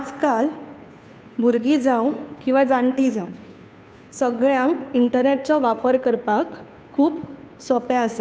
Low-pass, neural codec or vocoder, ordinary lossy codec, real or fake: none; codec, 16 kHz, 2 kbps, FunCodec, trained on Chinese and English, 25 frames a second; none; fake